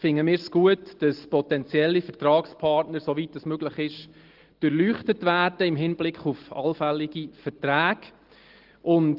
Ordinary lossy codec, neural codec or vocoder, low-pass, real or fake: Opus, 16 kbps; none; 5.4 kHz; real